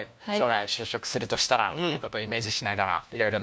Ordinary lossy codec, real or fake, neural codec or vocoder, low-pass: none; fake; codec, 16 kHz, 1 kbps, FunCodec, trained on LibriTTS, 50 frames a second; none